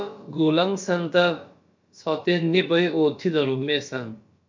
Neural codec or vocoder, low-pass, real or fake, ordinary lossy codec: codec, 16 kHz, about 1 kbps, DyCAST, with the encoder's durations; 7.2 kHz; fake; MP3, 48 kbps